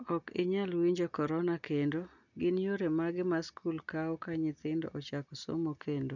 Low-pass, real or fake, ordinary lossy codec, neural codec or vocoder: 7.2 kHz; real; none; none